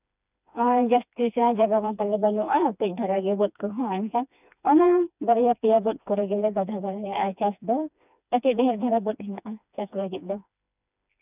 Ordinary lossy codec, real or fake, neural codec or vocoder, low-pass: none; fake; codec, 16 kHz, 2 kbps, FreqCodec, smaller model; 3.6 kHz